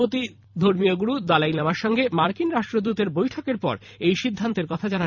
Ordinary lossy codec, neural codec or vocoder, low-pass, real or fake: none; vocoder, 44.1 kHz, 128 mel bands every 256 samples, BigVGAN v2; 7.2 kHz; fake